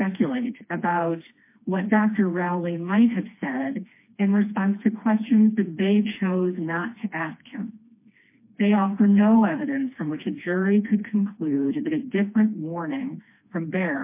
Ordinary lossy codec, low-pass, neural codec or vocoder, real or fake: MP3, 24 kbps; 3.6 kHz; codec, 16 kHz, 2 kbps, FreqCodec, smaller model; fake